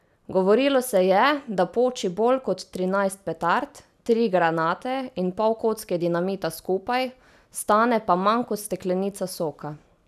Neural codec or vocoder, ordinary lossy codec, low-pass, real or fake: none; none; 14.4 kHz; real